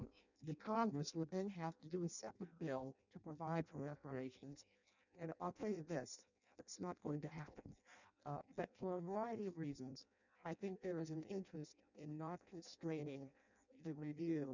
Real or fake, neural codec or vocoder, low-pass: fake; codec, 16 kHz in and 24 kHz out, 0.6 kbps, FireRedTTS-2 codec; 7.2 kHz